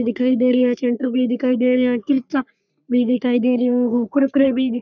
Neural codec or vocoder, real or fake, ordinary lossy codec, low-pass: codec, 44.1 kHz, 3.4 kbps, Pupu-Codec; fake; none; 7.2 kHz